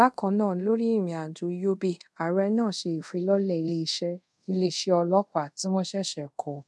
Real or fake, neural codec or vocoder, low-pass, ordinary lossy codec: fake; codec, 24 kHz, 0.5 kbps, DualCodec; none; none